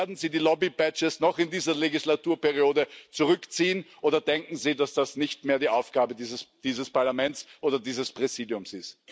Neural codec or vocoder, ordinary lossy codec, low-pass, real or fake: none; none; none; real